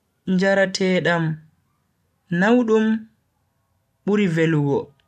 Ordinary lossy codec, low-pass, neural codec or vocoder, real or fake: AAC, 96 kbps; 14.4 kHz; none; real